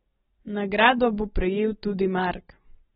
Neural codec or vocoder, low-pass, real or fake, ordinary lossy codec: none; 7.2 kHz; real; AAC, 16 kbps